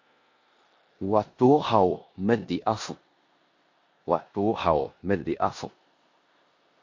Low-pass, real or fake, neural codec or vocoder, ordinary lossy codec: 7.2 kHz; fake; codec, 16 kHz in and 24 kHz out, 0.9 kbps, LongCat-Audio-Codec, four codebook decoder; MP3, 48 kbps